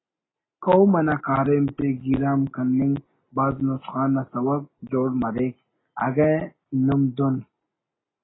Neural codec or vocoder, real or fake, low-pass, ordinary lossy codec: none; real; 7.2 kHz; AAC, 16 kbps